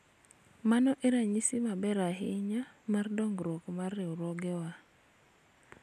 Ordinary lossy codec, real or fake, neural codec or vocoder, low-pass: none; real; none; 14.4 kHz